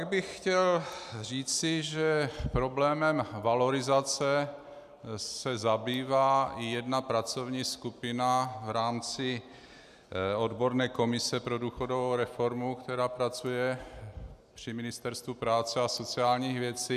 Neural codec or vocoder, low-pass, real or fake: none; 14.4 kHz; real